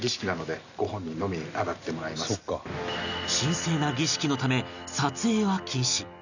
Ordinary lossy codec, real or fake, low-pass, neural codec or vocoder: none; real; 7.2 kHz; none